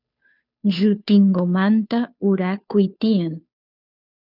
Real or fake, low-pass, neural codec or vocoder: fake; 5.4 kHz; codec, 16 kHz, 2 kbps, FunCodec, trained on Chinese and English, 25 frames a second